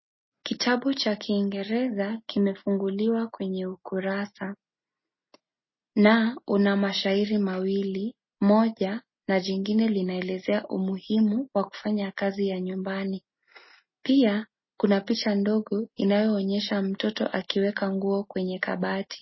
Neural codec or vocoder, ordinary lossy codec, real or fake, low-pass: none; MP3, 24 kbps; real; 7.2 kHz